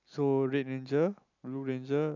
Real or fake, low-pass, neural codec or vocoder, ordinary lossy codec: real; 7.2 kHz; none; none